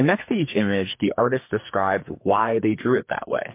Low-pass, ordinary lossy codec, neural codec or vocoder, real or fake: 3.6 kHz; MP3, 24 kbps; codec, 44.1 kHz, 2.6 kbps, SNAC; fake